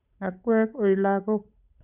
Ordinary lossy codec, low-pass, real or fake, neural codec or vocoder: none; 3.6 kHz; fake; codec, 16 kHz, 8 kbps, FunCodec, trained on Chinese and English, 25 frames a second